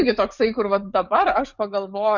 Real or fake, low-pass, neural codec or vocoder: fake; 7.2 kHz; codec, 44.1 kHz, 7.8 kbps, DAC